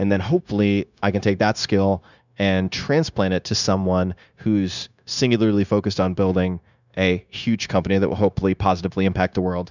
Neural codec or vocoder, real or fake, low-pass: codec, 16 kHz, 0.9 kbps, LongCat-Audio-Codec; fake; 7.2 kHz